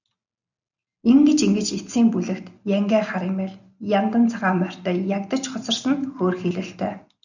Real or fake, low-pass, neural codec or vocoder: real; 7.2 kHz; none